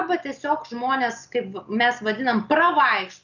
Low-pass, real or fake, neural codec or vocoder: 7.2 kHz; real; none